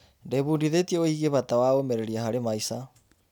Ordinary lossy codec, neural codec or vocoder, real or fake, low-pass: none; none; real; none